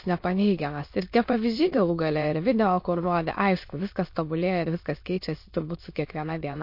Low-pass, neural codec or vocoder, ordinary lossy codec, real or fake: 5.4 kHz; autoencoder, 22.05 kHz, a latent of 192 numbers a frame, VITS, trained on many speakers; MP3, 32 kbps; fake